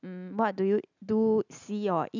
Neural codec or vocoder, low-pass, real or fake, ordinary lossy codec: none; 7.2 kHz; real; none